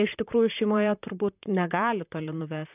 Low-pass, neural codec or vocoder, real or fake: 3.6 kHz; vocoder, 44.1 kHz, 128 mel bands, Pupu-Vocoder; fake